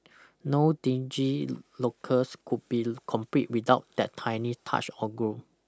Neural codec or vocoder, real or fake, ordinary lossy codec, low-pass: none; real; none; none